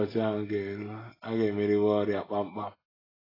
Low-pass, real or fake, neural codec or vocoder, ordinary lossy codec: 5.4 kHz; real; none; AAC, 24 kbps